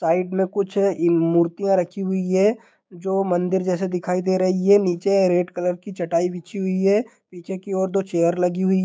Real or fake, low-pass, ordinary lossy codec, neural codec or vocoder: fake; none; none; codec, 16 kHz, 6 kbps, DAC